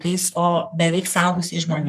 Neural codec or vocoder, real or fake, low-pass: codec, 44.1 kHz, 3.4 kbps, Pupu-Codec; fake; 14.4 kHz